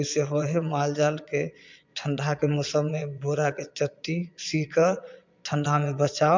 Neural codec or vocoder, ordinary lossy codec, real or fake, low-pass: vocoder, 22.05 kHz, 80 mel bands, Vocos; MP3, 64 kbps; fake; 7.2 kHz